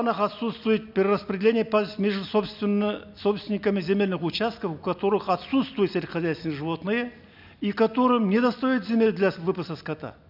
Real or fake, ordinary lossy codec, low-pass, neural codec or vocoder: real; none; 5.4 kHz; none